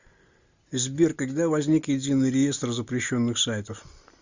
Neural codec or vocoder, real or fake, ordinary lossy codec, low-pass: none; real; Opus, 64 kbps; 7.2 kHz